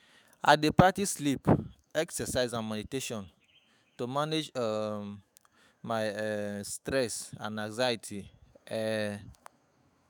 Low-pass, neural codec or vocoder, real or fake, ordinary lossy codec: none; autoencoder, 48 kHz, 128 numbers a frame, DAC-VAE, trained on Japanese speech; fake; none